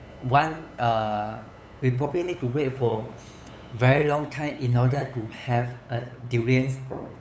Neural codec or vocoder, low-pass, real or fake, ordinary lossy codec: codec, 16 kHz, 8 kbps, FunCodec, trained on LibriTTS, 25 frames a second; none; fake; none